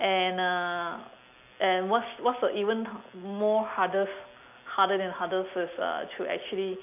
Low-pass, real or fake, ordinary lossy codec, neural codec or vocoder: 3.6 kHz; real; none; none